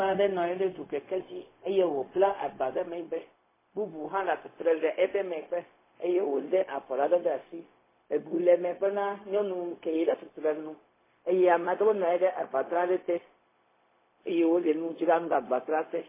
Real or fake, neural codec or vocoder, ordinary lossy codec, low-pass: fake; codec, 16 kHz, 0.4 kbps, LongCat-Audio-Codec; MP3, 16 kbps; 3.6 kHz